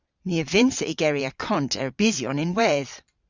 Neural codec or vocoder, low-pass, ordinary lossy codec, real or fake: vocoder, 22.05 kHz, 80 mel bands, Vocos; 7.2 kHz; Opus, 64 kbps; fake